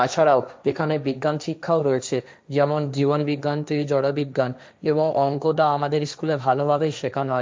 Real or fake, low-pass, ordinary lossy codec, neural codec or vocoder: fake; none; none; codec, 16 kHz, 1.1 kbps, Voila-Tokenizer